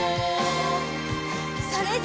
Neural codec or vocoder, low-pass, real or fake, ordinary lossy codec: none; none; real; none